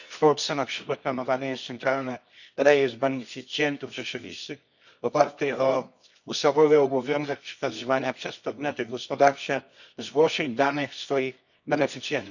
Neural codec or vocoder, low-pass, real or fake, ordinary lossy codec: codec, 24 kHz, 0.9 kbps, WavTokenizer, medium music audio release; 7.2 kHz; fake; none